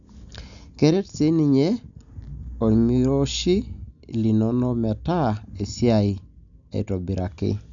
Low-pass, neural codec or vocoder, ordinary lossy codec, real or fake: 7.2 kHz; none; none; real